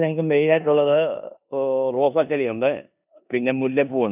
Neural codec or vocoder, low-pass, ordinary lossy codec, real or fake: codec, 16 kHz in and 24 kHz out, 0.9 kbps, LongCat-Audio-Codec, four codebook decoder; 3.6 kHz; none; fake